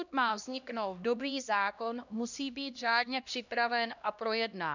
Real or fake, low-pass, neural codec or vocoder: fake; 7.2 kHz; codec, 16 kHz, 1 kbps, X-Codec, HuBERT features, trained on LibriSpeech